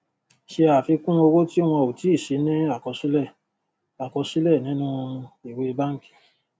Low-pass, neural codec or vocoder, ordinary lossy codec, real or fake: none; none; none; real